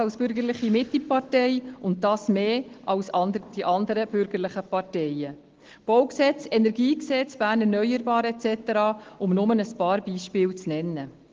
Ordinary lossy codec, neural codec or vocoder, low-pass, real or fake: Opus, 16 kbps; none; 7.2 kHz; real